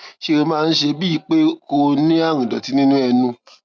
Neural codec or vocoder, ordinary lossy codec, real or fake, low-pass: none; none; real; none